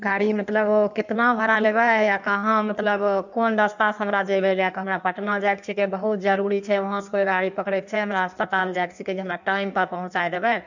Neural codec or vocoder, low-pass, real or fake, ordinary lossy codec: codec, 16 kHz in and 24 kHz out, 1.1 kbps, FireRedTTS-2 codec; 7.2 kHz; fake; none